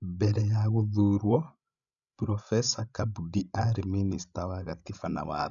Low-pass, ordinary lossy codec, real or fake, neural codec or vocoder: 7.2 kHz; none; fake; codec, 16 kHz, 16 kbps, FreqCodec, larger model